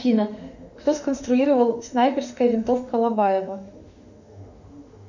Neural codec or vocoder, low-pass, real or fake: autoencoder, 48 kHz, 32 numbers a frame, DAC-VAE, trained on Japanese speech; 7.2 kHz; fake